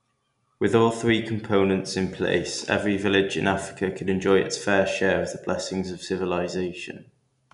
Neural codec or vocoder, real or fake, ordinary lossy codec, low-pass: none; real; none; 10.8 kHz